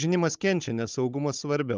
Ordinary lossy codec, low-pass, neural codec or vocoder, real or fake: Opus, 24 kbps; 7.2 kHz; codec, 16 kHz, 16 kbps, FunCodec, trained on Chinese and English, 50 frames a second; fake